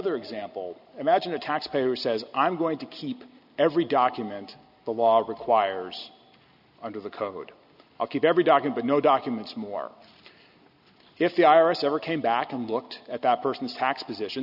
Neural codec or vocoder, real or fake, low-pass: none; real; 5.4 kHz